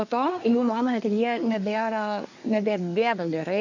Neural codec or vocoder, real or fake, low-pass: codec, 24 kHz, 1 kbps, SNAC; fake; 7.2 kHz